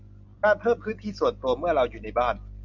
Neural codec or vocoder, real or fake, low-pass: none; real; 7.2 kHz